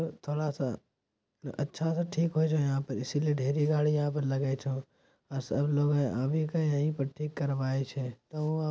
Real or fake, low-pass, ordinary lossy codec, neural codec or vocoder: real; none; none; none